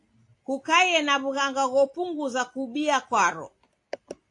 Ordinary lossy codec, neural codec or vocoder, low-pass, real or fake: AAC, 48 kbps; none; 10.8 kHz; real